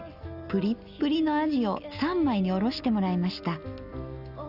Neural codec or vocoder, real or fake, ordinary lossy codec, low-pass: none; real; none; 5.4 kHz